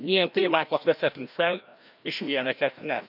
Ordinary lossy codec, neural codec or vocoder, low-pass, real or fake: none; codec, 16 kHz, 1 kbps, FreqCodec, larger model; 5.4 kHz; fake